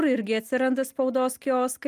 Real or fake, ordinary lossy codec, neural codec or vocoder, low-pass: real; Opus, 32 kbps; none; 14.4 kHz